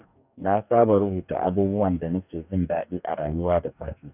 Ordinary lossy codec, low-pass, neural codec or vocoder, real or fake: none; 3.6 kHz; codec, 44.1 kHz, 2.6 kbps, DAC; fake